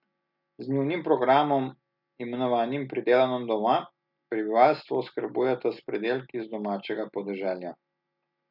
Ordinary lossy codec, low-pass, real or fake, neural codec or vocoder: none; 5.4 kHz; real; none